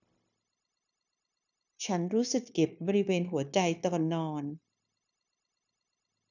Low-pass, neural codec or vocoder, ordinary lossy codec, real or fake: 7.2 kHz; codec, 16 kHz, 0.9 kbps, LongCat-Audio-Codec; none; fake